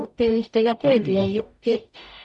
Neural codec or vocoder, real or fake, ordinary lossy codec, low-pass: codec, 44.1 kHz, 0.9 kbps, DAC; fake; none; 10.8 kHz